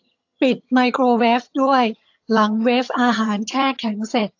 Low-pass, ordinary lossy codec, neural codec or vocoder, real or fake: 7.2 kHz; AAC, 48 kbps; vocoder, 22.05 kHz, 80 mel bands, HiFi-GAN; fake